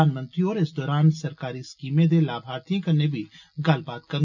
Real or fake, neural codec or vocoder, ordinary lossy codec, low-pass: real; none; none; 7.2 kHz